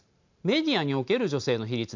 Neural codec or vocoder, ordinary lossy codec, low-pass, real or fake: none; MP3, 64 kbps; 7.2 kHz; real